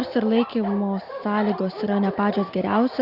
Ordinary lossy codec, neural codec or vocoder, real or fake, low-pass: Opus, 64 kbps; none; real; 5.4 kHz